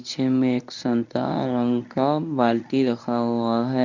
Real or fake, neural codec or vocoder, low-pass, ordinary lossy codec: fake; codec, 24 kHz, 0.9 kbps, WavTokenizer, medium speech release version 1; 7.2 kHz; none